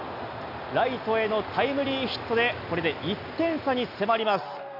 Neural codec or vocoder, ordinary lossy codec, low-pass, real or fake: none; none; 5.4 kHz; real